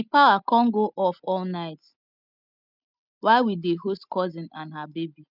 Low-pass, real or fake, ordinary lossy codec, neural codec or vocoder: 5.4 kHz; real; none; none